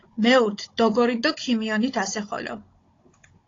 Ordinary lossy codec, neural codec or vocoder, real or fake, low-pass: AAC, 32 kbps; codec, 16 kHz, 16 kbps, FunCodec, trained on Chinese and English, 50 frames a second; fake; 7.2 kHz